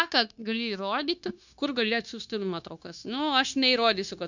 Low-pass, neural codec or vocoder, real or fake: 7.2 kHz; codec, 16 kHz, 0.9 kbps, LongCat-Audio-Codec; fake